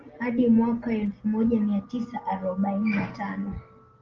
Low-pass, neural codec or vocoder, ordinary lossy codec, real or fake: 7.2 kHz; none; Opus, 24 kbps; real